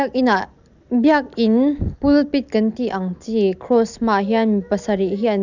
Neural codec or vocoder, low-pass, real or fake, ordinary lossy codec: none; 7.2 kHz; real; none